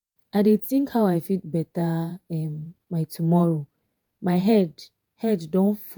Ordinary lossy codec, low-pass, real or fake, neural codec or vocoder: none; none; fake; vocoder, 48 kHz, 128 mel bands, Vocos